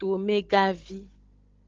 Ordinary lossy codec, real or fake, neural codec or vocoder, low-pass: Opus, 16 kbps; fake; codec, 16 kHz, 16 kbps, FunCodec, trained on Chinese and English, 50 frames a second; 7.2 kHz